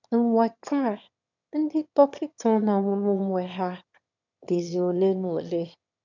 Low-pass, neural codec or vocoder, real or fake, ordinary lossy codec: 7.2 kHz; autoencoder, 22.05 kHz, a latent of 192 numbers a frame, VITS, trained on one speaker; fake; none